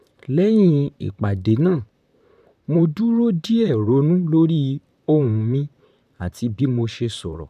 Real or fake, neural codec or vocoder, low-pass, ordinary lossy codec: fake; vocoder, 44.1 kHz, 128 mel bands, Pupu-Vocoder; 14.4 kHz; none